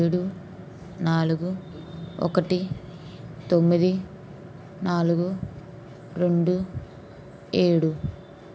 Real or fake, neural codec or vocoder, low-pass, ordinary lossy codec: real; none; none; none